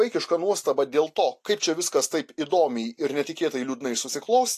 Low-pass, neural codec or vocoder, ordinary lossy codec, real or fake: 14.4 kHz; none; AAC, 64 kbps; real